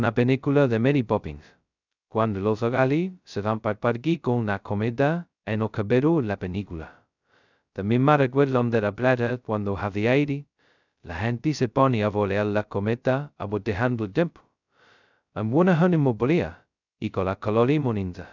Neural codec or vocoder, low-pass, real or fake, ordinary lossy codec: codec, 16 kHz, 0.2 kbps, FocalCodec; 7.2 kHz; fake; none